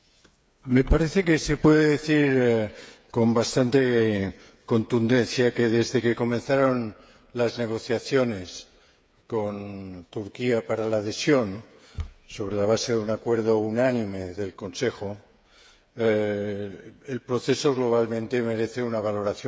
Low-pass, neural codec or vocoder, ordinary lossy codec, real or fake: none; codec, 16 kHz, 8 kbps, FreqCodec, smaller model; none; fake